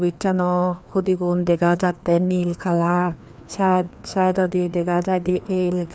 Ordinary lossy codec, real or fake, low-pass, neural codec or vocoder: none; fake; none; codec, 16 kHz, 2 kbps, FreqCodec, larger model